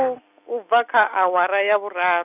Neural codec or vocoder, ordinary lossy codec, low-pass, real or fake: none; none; 3.6 kHz; real